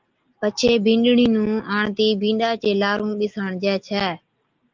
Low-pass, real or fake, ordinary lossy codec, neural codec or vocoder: 7.2 kHz; real; Opus, 24 kbps; none